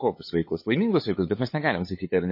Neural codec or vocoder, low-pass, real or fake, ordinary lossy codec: codec, 16 kHz, 8 kbps, FunCodec, trained on LibriTTS, 25 frames a second; 5.4 kHz; fake; MP3, 24 kbps